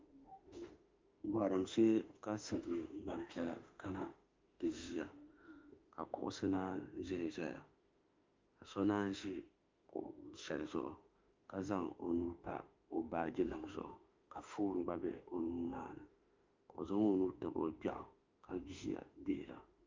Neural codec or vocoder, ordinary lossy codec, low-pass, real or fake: autoencoder, 48 kHz, 32 numbers a frame, DAC-VAE, trained on Japanese speech; Opus, 24 kbps; 7.2 kHz; fake